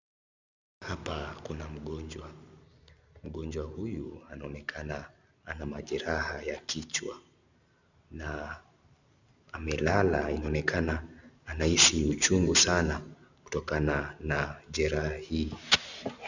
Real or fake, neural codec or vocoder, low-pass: real; none; 7.2 kHz